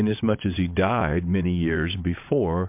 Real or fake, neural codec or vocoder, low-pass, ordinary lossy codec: fake; vocoder, 22.05 kHz, 80 mel bands, WaveNeXt; 3.6 kHz; MP3, 32 kbps